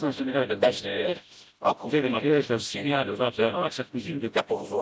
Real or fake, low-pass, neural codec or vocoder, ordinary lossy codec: fake; none; codec, 16 kHz, 0.5 kbps, FreqCodec, smaller model; none